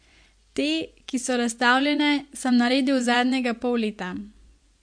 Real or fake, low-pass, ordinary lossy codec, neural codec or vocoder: fake; 9.9 kHz; MP3, 64 kbps; vocoder, 24 kHz, 100 mel bands, Vocos